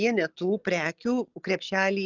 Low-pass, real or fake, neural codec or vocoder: 7.2 kHz; real; none